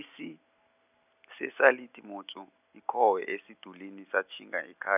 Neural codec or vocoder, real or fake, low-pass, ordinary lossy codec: none; real; 3.6 kHz; none